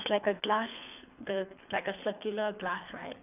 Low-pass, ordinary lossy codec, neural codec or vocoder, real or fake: 3.6 kHz; none; codec, 24 kHz, 3 kbps, HILCodec; fake